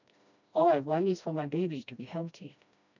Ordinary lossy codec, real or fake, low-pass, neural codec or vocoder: none; fake; 7.2 kHz; codec, 16 kHz, 1 kbps, FreqCodec, smaller model